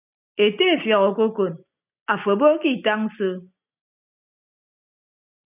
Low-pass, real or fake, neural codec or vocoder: 3.6 kHz; real; none